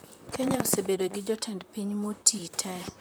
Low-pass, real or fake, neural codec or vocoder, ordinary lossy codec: none; fake; vocoder, 44.1 kHz, 128 mel bands, Pupu-Vocoder; none